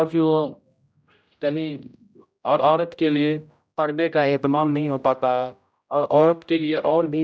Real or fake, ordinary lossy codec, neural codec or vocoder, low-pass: fake; none; codec, 16 kHz, 0.5 kbps, X-Codec, HuBERT features, trained on general audio; none